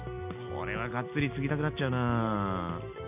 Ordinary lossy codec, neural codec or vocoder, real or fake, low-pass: none; none; real; 3.6 kHz